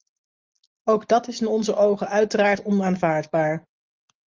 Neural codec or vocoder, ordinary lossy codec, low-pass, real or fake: none; Opus, 32 kbps; 7.2 kHz; real